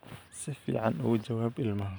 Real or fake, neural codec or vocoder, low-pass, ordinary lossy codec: fake; vocoder, 44.1 kHz, 128 mel bands every 512 samples, BigVGAN v2; none; none